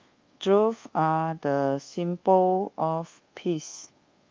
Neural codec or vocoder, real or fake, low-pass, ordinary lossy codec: codec, 24 kHz, 1.2 kbps, DualCodec; fake; 7.2 kHz; Opus, 24 kbps